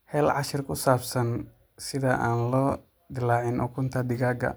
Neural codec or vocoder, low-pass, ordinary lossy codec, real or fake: none; none; none; real